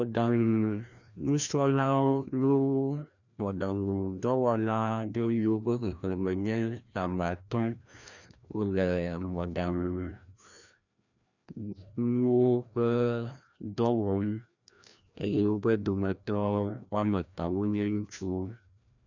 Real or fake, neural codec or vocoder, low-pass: fake; codec, 16 kHz, 1 kbps, FreqCodec, larger model; 7.2 kHz